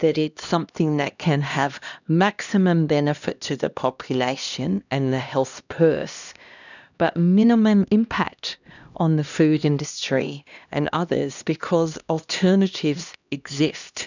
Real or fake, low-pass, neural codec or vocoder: fake; 7.2 kHz; codec, 16 kHz, 1 kbps, X-Codec, HuBERT features, trained on LibriSpeech